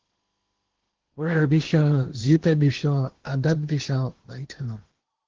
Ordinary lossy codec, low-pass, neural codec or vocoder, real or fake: Opus, 16 kbps; 7.2 kHz; codec, 16 kHz in and 24 kHz out, 0.8 kbps, FocalCodec, streaming, 65536 codes; fake